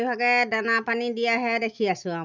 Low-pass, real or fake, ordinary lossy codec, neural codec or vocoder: 7.2 kHz; real; none; none